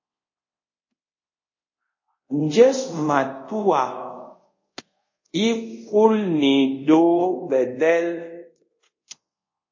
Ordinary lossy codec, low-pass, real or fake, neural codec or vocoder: MP3, 32 kbps; 7.2 kHz; fake; codec, 24 kHz, 0.5 kbps, DualCodec